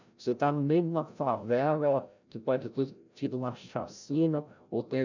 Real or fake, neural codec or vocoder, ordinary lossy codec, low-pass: fake; codec, 16 kHz, 0.5 kbps, FreqCodec, larger model; none; 7.2 kHz